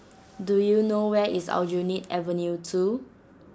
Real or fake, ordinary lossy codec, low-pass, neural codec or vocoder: real; none; none; none